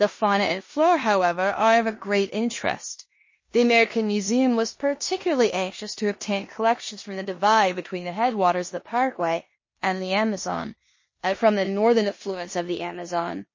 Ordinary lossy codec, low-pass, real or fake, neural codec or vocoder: MP3, 32 kbps; 7.2 kHz; fake; codec, 16 kHz in and 24 kHz out, 0.9 kbps, LongCat-Audio-Codec, four codebook decoder